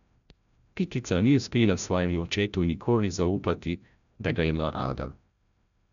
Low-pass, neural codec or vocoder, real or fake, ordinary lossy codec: 7.2 kHz; codec, 16 kHz, 0.5 kbps, FreqCodec, larger model; fake; none